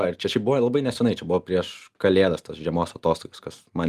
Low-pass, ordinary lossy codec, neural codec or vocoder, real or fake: 14.4 kHz; Opus, 32 kbps; none; real